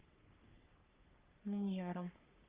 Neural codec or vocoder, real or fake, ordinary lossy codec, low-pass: codec, 44.1 kHz, 3.4 kbps, Pupu-Codec; fake; Opus, 32 kbps; 3.6 kHz